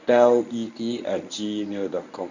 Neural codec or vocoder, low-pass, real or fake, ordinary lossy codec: codec, 24 kHz, 0.9 kbps, WavTokenizer, medium speech release version 1; 7.2 kHz; fake; none